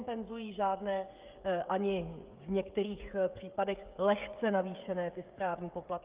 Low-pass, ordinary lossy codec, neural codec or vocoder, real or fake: 3.6 kHz; Opus, 32 kbps; codec, 16 kHz, 16 kbps, FreqCodec, smaller model; fake